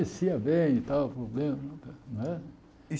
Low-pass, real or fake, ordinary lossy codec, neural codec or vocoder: none; real; none; none